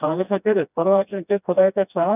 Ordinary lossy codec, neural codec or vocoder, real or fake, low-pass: none; codec, 16 kHz, 1 kbps, FreqCodec, smaller model; fake; 3.6 kHz